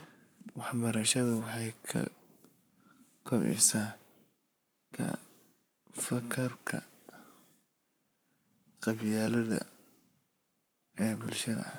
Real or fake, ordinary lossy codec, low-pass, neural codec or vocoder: fake; none; none; codec, 44.1 kHz, 7.8 kbps, Pupu-Codec